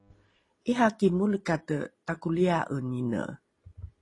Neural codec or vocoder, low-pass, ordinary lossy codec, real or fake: none; 10.8 kHz; AAC, 32 kbps; real